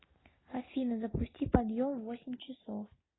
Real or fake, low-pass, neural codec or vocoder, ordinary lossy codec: real; 7.2 kHz; none; AAC, 16 kbps